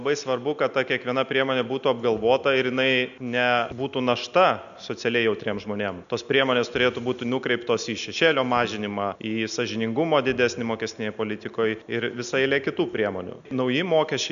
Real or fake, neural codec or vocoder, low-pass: real; none; 7.2 kHz